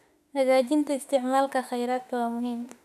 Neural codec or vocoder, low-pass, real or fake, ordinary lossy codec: autoencoder, 48 kHz, 32 numbers a frame, DAC-VAE, trained on Japanese speech; 14.4 kHz; fake; none